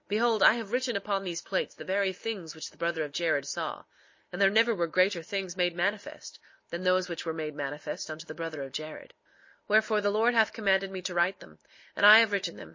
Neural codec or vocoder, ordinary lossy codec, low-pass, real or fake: none; MP3, 32 kbps; 7.2 kHz; real